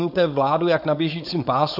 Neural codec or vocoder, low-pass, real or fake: codec, 16 kHz, 4.8 kbps, FACodec; 5.4 kHz; fake